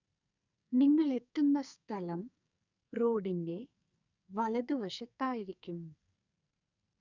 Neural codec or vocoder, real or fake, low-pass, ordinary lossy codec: codec, 44.1 kHz, 2.6 kbps, SNAC; fake; 7.2 kHz; none